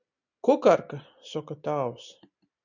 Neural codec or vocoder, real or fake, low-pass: none; real; 7.2 kHz